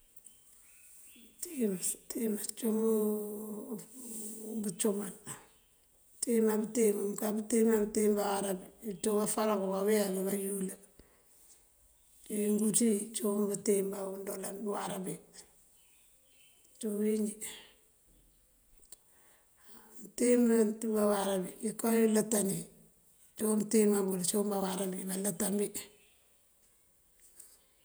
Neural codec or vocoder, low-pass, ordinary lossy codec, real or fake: vocoder, 48 kHz, 128 mel bands, Vocos; none; none; fake